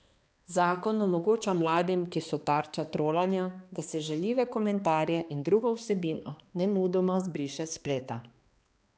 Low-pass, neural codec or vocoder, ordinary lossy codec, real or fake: none; codec, 16 kHz, 2 kbps, X-Codec, HuBERT features, trained on balanced general audio; none; fake